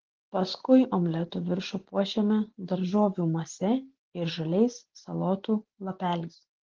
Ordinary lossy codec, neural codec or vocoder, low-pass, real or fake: Opus, 16 kbps; none; 7.2 kHz; real